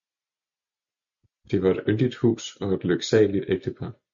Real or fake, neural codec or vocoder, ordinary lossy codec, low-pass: real; none; MP3, 64 kbps; 7.2 kHz